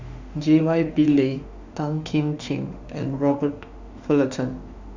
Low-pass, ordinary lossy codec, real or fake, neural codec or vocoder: 7.2 kHz; Opus, 64 kbps; fake; autoencoder, 48 kHz, 32 numbers a frame, DAC-VAE, trained on Japanese speech